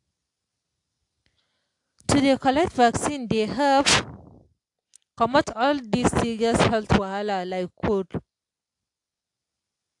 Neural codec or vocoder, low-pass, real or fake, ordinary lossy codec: none; 10.8 kHz; real; AAC, 64 kbps